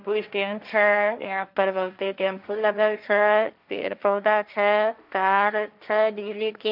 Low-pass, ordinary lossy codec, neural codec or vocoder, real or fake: 5.4 kHz; none; codec, 16 kHz, 1.1 kbps, Voila-Tokenizer; fake